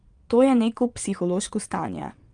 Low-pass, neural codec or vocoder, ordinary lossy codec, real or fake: 9.9 kHz; vocoder, 22.05 kHz, 80 mel bands, WaveNeXt; Opus, 32 kbps; fake